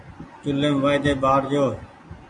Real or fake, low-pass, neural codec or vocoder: real; 10.8 kHz; none